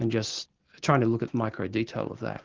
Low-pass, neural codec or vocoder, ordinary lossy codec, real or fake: 7.2 kHz; none; Opus, 16 kbps; real